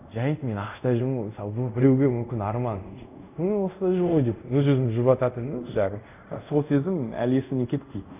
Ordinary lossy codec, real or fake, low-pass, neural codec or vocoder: none; fake; 3.6 kHz; codec, 24 kHz, 0.5 kbps, DualCodec